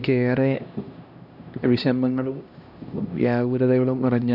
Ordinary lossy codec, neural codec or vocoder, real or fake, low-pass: none; codec, 16 kHz, 1 kbps, X-Codec, HuBERT features, trained on LibriSpeech; fake; 5.4 kHz